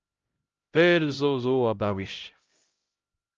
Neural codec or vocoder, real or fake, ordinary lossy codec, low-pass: codec, 16 kHz, 0.5 kbps, X-Codec, HuBERT features, trained on LibriSpeech; fake; Opus, 24 kbps; 7.2 kHz